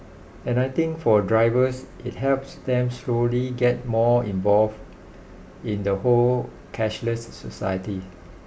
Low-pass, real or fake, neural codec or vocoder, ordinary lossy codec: none; real; none; none